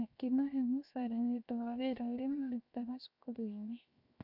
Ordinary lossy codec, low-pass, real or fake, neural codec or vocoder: none; 5.4 kHz; fake; codec, 16 kHz, 0.7 kbps, FocalCodec